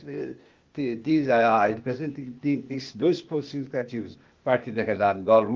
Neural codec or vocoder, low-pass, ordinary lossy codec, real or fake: codec, 16 kHz, 0.8 kbps, ZipCodec; 7.2 kHz; Opus, 32 kbps; fake